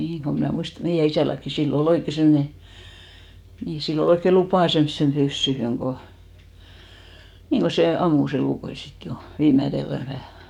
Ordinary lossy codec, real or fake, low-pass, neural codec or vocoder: none; fake; 19.8 kHz; codec, 44.1 kHz, 7.8 kbps, DAC